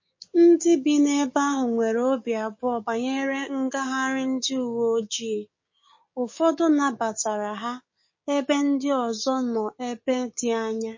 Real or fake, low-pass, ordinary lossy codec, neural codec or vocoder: fake; 7.2 kHz; MP3, 32 kbps; codec, 16 kHz, 6 kbps, DAC